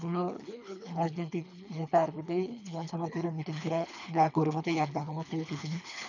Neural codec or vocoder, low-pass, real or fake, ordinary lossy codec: codec, 24 kHz, 3 kbps, HILCodec; 7.2 kHz; fake; none